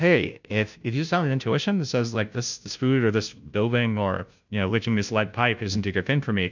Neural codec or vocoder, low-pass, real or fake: codec, 16 kHz, 0.5 kbps, FunCodec, trained on Chinese and English, 25 frames a second; 7.2 kHz; fake